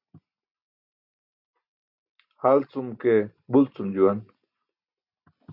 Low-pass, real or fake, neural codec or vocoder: 5.4 kHz; real; none